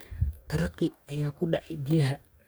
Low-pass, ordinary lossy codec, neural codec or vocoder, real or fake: none; none; codec, 44.1 kHz, 2.6 kbps, DAC; fake